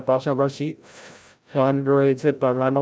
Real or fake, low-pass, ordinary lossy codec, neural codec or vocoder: fake; none; none; codec, 16 kHz, 0.5 kbps, FreqCodec, larger model